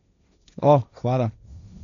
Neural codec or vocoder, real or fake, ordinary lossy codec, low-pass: codec, 16 kHz, 1.1 kbps, Voila-Tokenizer; fake; none; 7.2 kHz